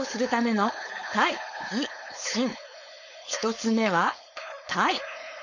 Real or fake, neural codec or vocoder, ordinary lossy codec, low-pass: fake; codec, 16 kHz, 4.8 kbps, FACodec; none; 7.2 kHz